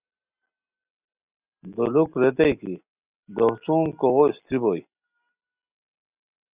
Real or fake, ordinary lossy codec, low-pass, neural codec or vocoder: real; Opus, 64 kbps; 3.6 kHz; none